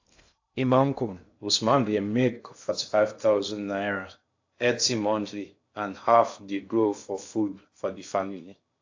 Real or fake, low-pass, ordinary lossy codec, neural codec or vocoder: fake; 7.2 kHz; AAC, 48 kbps; codec, 16 kHz in and 24 kHz out, 0.6 kbps, FocalCodec, streaming, 2048 codes